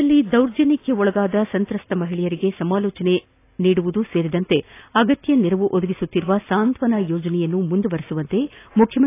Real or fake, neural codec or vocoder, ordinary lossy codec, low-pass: real; none; AAC, 24 kbps; 3.6 kHz